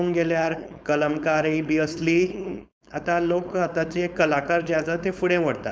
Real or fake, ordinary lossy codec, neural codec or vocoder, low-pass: fake; none; codec, 16 kHz, 4.8 kbps, FACodec; none